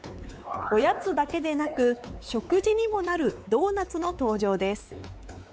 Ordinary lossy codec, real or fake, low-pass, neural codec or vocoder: none; fake; none; codec, 16 kHz, 4 kbps, X-Codec, WavLM features, trained on Multilingual LibriSpeech